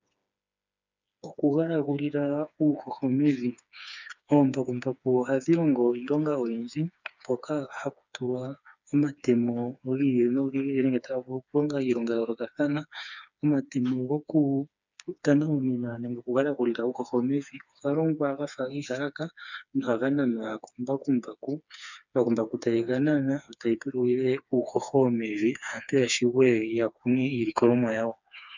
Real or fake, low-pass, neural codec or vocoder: fake; 7.2 kHz; codec, 16 kHz, 4 kbps, FreqCodec, smaller model